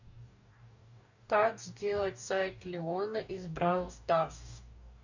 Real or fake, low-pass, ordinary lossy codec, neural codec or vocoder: fake; 7.2 kHz; none; codec, 44.1 kHz, 2.6 kbps, DAC